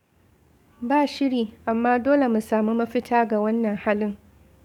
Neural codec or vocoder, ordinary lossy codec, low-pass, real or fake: codec, 44.1 kHz, 7.8 kbps, DAC; none; 19.8 kHz; fake